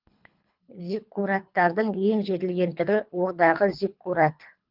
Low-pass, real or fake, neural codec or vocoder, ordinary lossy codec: 5.4 kHz; fake; codec, 24 kHz, 3 kbps, HILCodec; Opus, 24 kbps